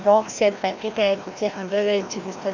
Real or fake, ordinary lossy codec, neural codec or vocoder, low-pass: fake; none; codec, 16 kHz, 1 kbps, FreqCodec, larger model; 7.2 kHz